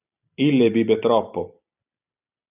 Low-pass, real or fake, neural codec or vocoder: 3.6 kHz; real; none